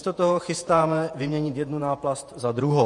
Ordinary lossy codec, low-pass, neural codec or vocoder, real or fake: MP3, 48 kbps; 10.8 kHz; vocoder, 48 kHz, 128 mel bands, Vocos; fake